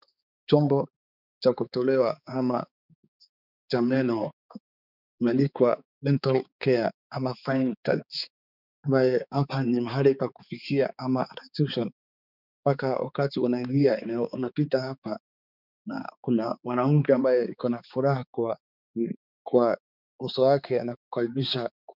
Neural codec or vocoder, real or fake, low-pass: codec, 16 kHz, 4 kbps, X-Codec, HuBERT features, trained on balanced general audio; fake; 5.4 kHz